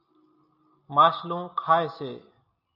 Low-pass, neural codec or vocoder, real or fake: 5.4 kHz; none; real